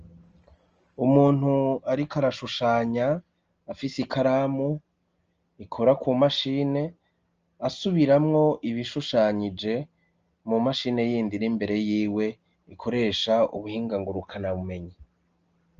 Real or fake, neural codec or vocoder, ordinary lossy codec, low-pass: real; none; Opus, 24 kbps; 7.2 kHz